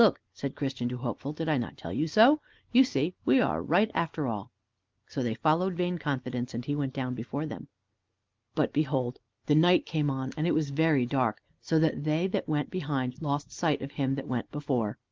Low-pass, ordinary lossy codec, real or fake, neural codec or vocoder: 7.2 kHz; Opus, 32 kbps; real; none